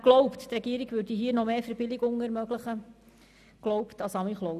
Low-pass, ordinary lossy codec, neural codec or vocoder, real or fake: 14.4 kHz; none; none; real